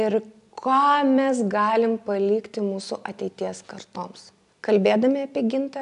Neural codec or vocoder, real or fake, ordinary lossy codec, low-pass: none; real; AAC, 96 kbps; 10.8 kHz